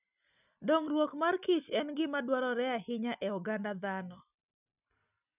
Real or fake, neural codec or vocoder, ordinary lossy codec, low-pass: real; none; none; 3.6 kHz